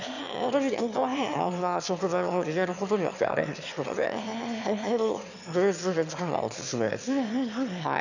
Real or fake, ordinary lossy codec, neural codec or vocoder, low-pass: fake; none; autoencoder, 22.05 kHz, a latent of 192 numbers a frame, VITS, trained on one speaker; 7.2 kHz